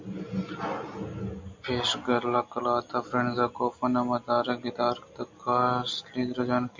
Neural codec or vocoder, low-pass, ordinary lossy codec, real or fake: none; 7.2 kHz; MP3, 64 kbps; real